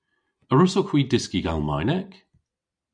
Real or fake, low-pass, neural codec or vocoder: real; 9.9 kHz; none